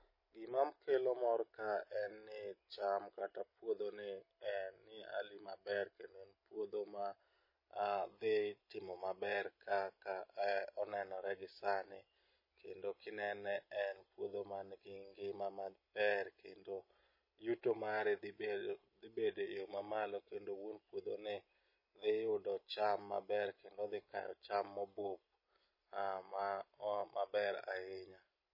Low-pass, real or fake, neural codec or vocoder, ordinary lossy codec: 5.4 kHz; real; none; MP3, 24 kbps